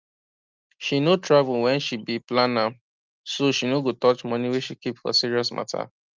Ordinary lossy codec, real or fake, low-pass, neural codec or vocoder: Opus, 24 kbps; real; 7.2 kHz; none